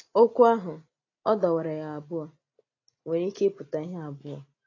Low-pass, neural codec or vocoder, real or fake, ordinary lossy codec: 7.2 kHz; none; real; AAC, 32 kbps